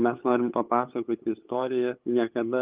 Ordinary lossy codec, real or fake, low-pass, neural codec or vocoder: Opus, 24 kbps; fake; 3.6 kHz; codec, 16 kHz, 8 kbps, FunCodec, trained on LibriTTS, 25 frames a second